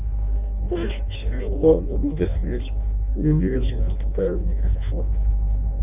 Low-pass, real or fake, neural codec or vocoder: 3.6 kHz; fake; codec, 16 kHz in and 24 kHz out, 0.6 kbps, FireRedTTS-2 codec